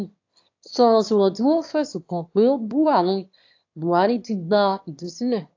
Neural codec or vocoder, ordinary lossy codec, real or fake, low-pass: autoencoder, 22.05 kHz, a latent of 192 numbers a frame, VITS, trained on one speaker; AAC, 48 kbps; fake; 7.2 kHz